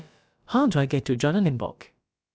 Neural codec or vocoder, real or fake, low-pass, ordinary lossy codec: codec, 16 kHz, about 1 kbps, DyCAST, with the encoder's durations; fake; none; none